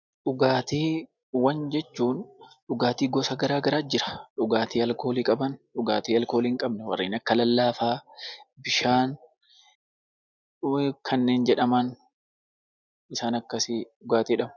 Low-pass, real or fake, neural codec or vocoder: 7.2 kHz; real; none